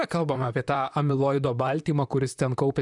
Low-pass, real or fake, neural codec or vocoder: 10.8 kHz; fake; vocoder, 44.1 kHz, 128 mel bands, Pupu-Vocoder